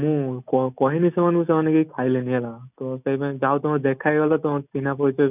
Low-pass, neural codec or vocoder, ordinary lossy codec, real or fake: 3.6 kHz; none; none; real